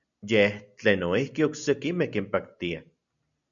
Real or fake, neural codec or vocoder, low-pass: real; none; 7.2 kHz